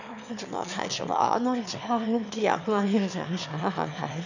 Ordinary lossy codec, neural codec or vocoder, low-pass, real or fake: none; autoencoder, 22.05 kHz, a latent of 192 numbers a frame, VITS, trained on one speaker; 7.2 kHz; fake